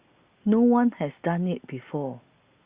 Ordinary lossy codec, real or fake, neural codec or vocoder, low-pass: Opus, 64 kbps; real; none; 3.6 kHz